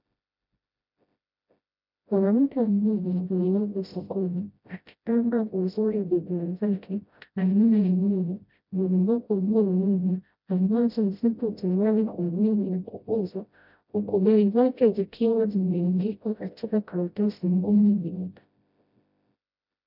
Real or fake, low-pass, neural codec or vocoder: fake; 5.4 kHz; codec, 16 kHz, 0.5 kbps, FreqCodec, smaller model